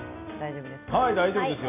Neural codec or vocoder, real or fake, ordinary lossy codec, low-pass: none; real; AAC, 24 kbps; 3.6 kHz